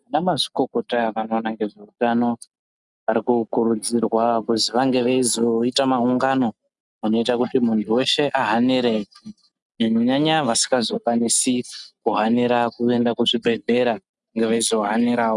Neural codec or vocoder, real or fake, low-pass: none; real; 10.8 kHz